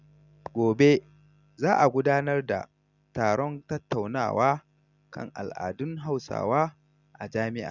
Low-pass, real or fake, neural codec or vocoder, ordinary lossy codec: 7.2 kHz; real; none; none